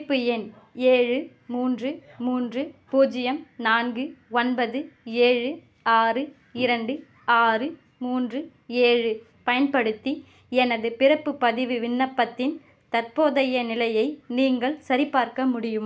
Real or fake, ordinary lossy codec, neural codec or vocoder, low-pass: real; none; none; none